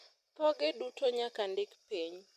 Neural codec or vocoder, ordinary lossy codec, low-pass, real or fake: none; MP3, 64 kbps; 14.4 kHz; real